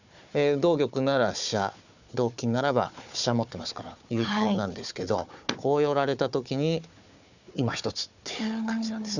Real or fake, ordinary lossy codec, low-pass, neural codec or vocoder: fake; none; 7.2 kHz; codec, 16 kHz, 4 kbps, FunCodec, trained on Chinese and English, 50 frames a second